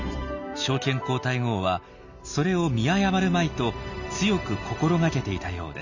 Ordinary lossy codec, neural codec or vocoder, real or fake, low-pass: none; none; real; 7.2 kHz